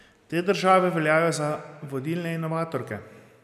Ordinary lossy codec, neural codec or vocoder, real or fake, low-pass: none; none; real; 14.4 kHz